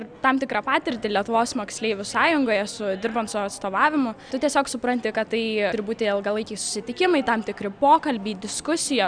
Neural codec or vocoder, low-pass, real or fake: none; 9.9 kHz; real